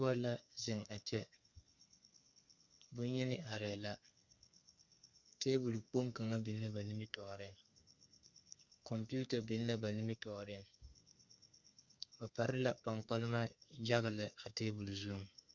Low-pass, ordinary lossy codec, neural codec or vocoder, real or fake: 7.2 kHz; Opus, 64 kbps; codec, 32 kHz, 1.9 kbps, SNAC; fake